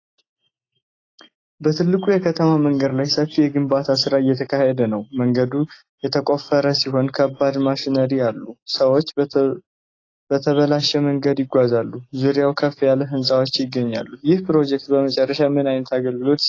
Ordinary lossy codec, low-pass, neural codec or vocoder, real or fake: AAC, 32 kbps; 7.2 kHz; none; real